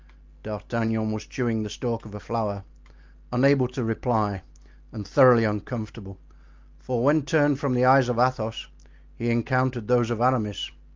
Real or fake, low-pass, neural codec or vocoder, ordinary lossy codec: real; 7.2 kHz; none; Opus, 32 kbps